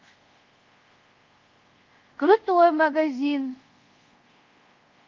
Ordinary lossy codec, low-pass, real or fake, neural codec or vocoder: Opus, 32 kbps; 7.2 kHz; fake; codec, 24 kHz, 0.5 kbps, DualCodec